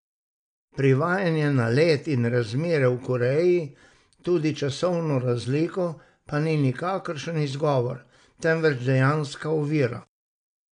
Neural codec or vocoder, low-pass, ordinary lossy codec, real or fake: none; 9.9 kHz; none; real